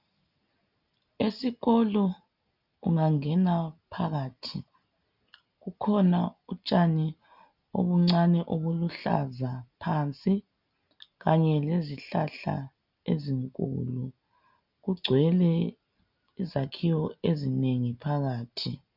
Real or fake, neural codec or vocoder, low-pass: real; none; 5.4 kHz